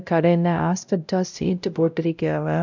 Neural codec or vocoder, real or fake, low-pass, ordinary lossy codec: codec, 16 kHz, 0.5 kbps, X-Codec, WavLM features, trained on Multilingual LibriSpeech; fake; 7.2 kHz; none